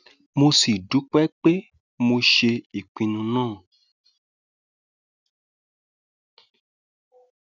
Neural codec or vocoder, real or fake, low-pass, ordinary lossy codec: none; real; 7.2 kHz; none